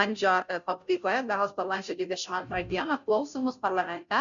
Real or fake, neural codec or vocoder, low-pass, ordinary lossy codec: fake; codec, 16 kHz, 0.5 kbps, FunCodec, trained on Chinese and English, 25 frames a second; 7.2 kHz; MP3, 96 kbps